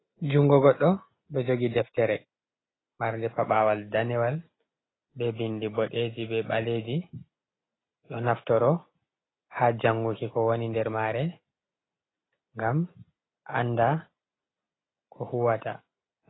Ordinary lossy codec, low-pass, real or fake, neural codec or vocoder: AAC, 16 kbps; 7.2 kHz; real; none